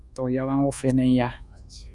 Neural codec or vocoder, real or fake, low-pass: codec, 24 kHz, 1.2 kbps, DualCodec; fake; 10.8 kHz